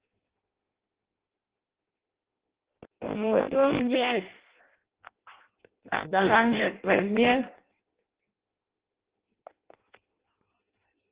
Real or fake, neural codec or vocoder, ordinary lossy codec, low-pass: fake; codec, 16 kHz in and 24 kHz out, 0.6 kbps, FireRedTTS-2 codec; Opus, 16 kbps; 3.6 kHz